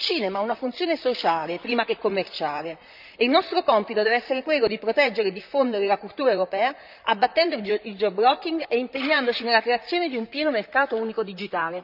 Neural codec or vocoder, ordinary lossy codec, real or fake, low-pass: vocoder, 44.1 kHz, 128 mel bands, Pupu-Vocoder; none; fake; 5.4 kHz